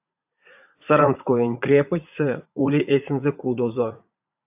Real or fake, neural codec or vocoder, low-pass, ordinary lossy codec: fake; vocoder, 44.1 kHz, 80 mel bands, Vocos; 3.6 kHz; AAC, 32 kbps